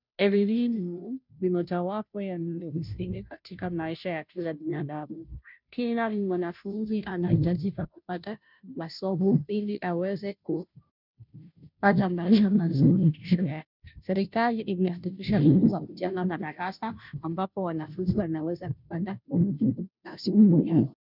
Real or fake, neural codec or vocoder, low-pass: fake; codec, 16 kHz, 0.5 kbps, FunCodec, trained on Chinese and English, 25 frames a second; 5.4 kHz